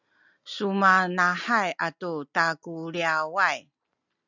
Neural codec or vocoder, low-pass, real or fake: none; 7.2 kHz; real